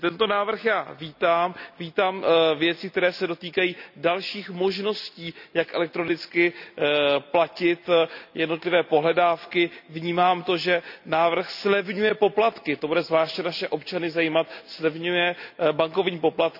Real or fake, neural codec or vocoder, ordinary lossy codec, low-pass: real; none; none; 5.4 kHz